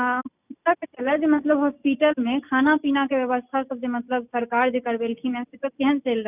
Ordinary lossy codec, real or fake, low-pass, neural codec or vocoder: none; real; 3.6 kHz; none